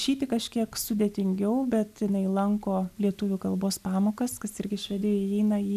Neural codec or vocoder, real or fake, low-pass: none; real; 14.4 kHz